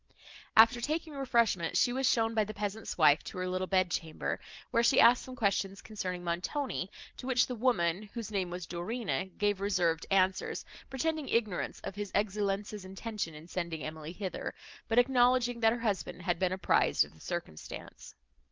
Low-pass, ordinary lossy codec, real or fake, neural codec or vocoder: 7.2 kHz; Opus, 32 kbps; real; none